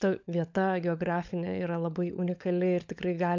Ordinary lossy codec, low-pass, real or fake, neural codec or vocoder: MP3, 64 kbps; 7.2 kHz; fake; codec, 16 kHz, 16 kbps, FunCodec, trained on LibriTTS, 50 frames a second